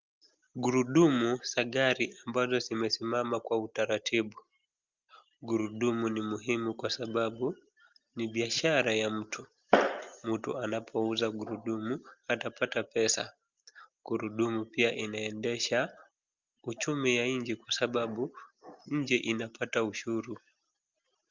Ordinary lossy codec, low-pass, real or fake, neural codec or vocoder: Opus, 32 kbps; 7.2 kHz; real; none